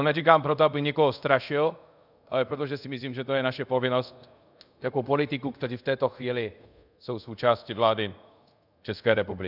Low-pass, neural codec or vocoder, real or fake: 5.4 kHz; codec, 24 kHz, 0.5 kbps, DualCodec; fake